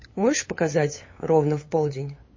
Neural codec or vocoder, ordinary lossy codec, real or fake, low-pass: codec, 16 kHz, 8 kbps, FunCodec, trained on LibriTTS, 25 frames a second; MP3, 32 kbps; fake; 7.2 kHz